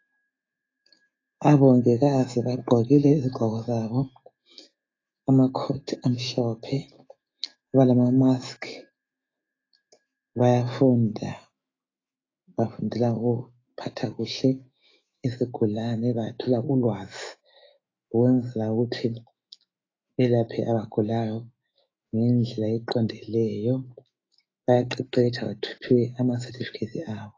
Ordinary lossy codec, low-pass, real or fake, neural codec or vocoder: AAC, 32 kbps; 7.2 kHz; fake; autoencoder, 48 kHz, 128 numbers a frame, DAC-VAE, trained on Japanese speech